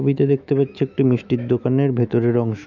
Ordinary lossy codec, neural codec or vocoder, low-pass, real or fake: none; autoencoder, 48 kHz, 128 numbers a frame, DAC-VAE, trained on Japanese speech; 7.2 kHz; fake